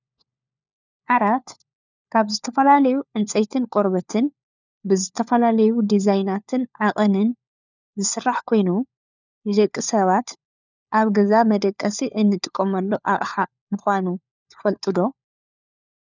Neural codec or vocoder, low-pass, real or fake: codec, 16 kHz, 4 kbps, FunCodec, trained on LibriTTS, 50 frames a second; 7.2 kHz; fake